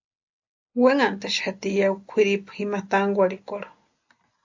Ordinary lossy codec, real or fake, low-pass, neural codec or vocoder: AAC, 48 kbps; real; 7.2 kHz; none